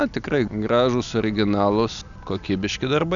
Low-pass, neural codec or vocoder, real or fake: 7.2 kHz; none; real